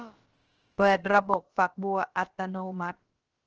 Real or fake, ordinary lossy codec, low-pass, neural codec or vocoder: fake; Opus, 16 kbps; 7.2 kHz; codec, 16 kHz, about 1 kbps, DyCAST, with the encoder's durations